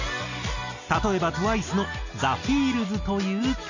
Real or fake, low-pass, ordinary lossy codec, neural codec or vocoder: real; 7.2 kHz; none; none